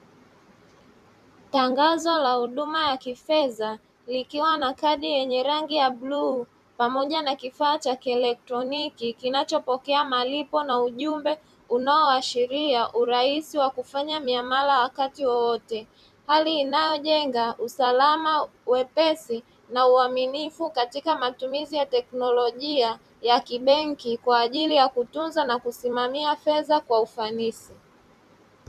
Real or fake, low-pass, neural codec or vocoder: fake; 14.4 kHz; vocoder, 44.1 kHz, 128 mel bands every 256 samples, BigVGAN v2